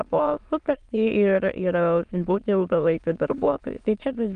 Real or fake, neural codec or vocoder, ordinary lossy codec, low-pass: fake; autoencoder, 22.05 kHz, a latent of 192 numbers a frame, VITS, trained on many speakers; Opus, 32 kbps; 9.9 kHz